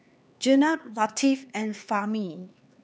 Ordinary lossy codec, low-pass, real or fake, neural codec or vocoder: none; none; fake; codec, 16 kHz, 4 kbps, X-Codec, HuBERT features, trained on LibriSpeech